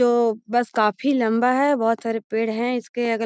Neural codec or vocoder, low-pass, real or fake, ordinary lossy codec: none; none; real; none